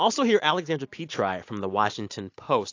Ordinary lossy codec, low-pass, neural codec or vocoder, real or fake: AAC, 48 kbps; 7.2 kHz; none; real